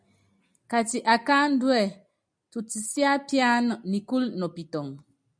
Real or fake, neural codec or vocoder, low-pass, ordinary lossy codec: real; none; 9.9 kHz; MP3, 96 kbps